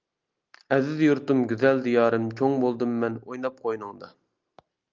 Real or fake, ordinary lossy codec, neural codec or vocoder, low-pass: real; Opus, 24 kbps; none; 7.2 kHz